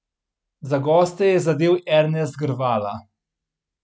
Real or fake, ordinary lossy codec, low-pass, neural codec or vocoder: real; none; none; none